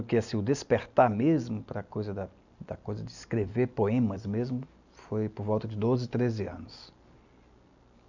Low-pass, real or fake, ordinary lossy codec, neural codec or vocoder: 7.2 kHz; real; none; none